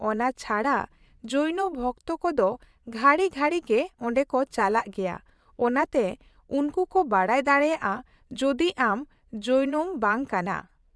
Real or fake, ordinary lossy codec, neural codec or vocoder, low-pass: fake; none; vocoder, 22.05 kHz, 80 mel bands, Vocos; none